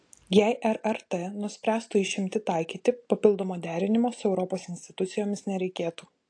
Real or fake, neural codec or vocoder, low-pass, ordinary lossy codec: real; none; 9.9 kHz; AAC, 48 kbps